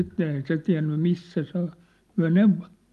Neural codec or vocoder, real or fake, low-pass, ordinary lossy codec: none; real; 14.4 kHz; Opus, 32 kbps